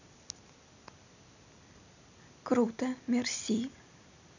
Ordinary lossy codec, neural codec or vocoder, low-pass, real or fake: none; none; 7.2 kHz; real